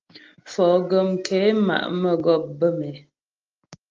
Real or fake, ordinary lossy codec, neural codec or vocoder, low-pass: real; Opus, 24 kbps; none; 7.2 kHz